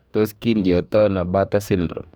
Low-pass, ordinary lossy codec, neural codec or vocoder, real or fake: none; none; codec, 44.1 kHz, 2.6 kbps, DAC; fake